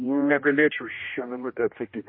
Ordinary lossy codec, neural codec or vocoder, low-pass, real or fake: MP3, 24 kbps; codec, 16 kHz, 1 kbps, X-Codec, HuBERT features, trained on general audio; 5.4 kHz; fake